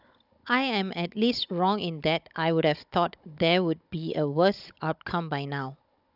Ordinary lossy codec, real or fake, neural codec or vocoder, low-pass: none; fake; codec, 16 kHz, 16 kbps, FunCodec, trained on Chinese and English, 50 frames a second; 5.4 kHz